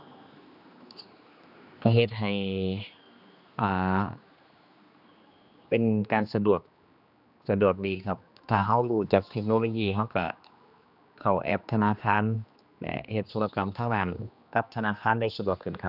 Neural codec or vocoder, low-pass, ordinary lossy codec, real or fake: codec, 16 kHz, 2 kbps, X-Codec, HuBERT features, trained on general audio; 5.4 kHz; none; fake